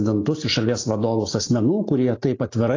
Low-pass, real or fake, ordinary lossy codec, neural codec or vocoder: 7.2 kHz; real; AAC, 32 kbps; none